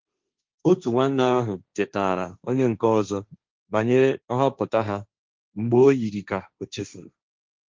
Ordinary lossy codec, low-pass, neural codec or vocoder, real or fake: Opus, 32 kbps; 7.2 kHz; codec, 16 kHz, 1.1 kbps, Voila-Tokenizer; fake